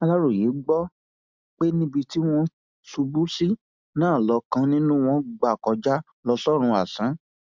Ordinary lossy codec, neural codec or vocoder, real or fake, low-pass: MP3, 64 kbps; none; real; 7.2 kHz